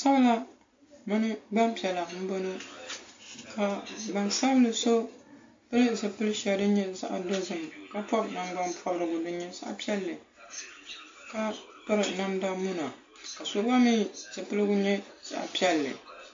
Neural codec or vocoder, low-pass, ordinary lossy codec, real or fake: none; 7.2 kHz; AAC, 48 kbps; real